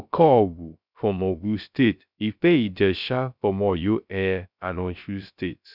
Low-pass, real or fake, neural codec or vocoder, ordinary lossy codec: 5.4 kHz; fake; codec, 16 kHz, 0.3 kbps, FocalCodec; none